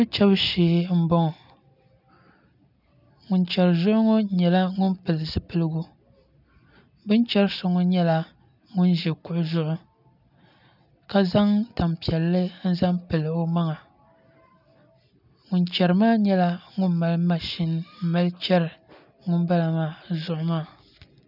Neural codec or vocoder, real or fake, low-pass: none; real; 5.4 kHz